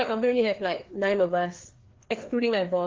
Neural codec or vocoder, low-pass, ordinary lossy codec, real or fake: codec, 16 kHz, 2 kbps, FreqCodec, larger model; 7.2 kHz; Opus, 16 kbps; fake